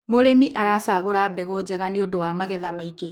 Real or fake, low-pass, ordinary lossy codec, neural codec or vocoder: fake; 19.8 kHz; none; codec, 44.1 kHz, 2.6 kbps, DAC